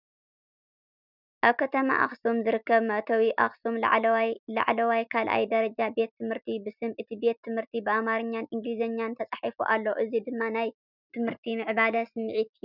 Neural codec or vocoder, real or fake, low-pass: none; real; 5.4 kHz